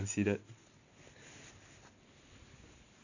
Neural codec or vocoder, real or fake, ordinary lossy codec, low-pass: none; real; none; 7.2 kHz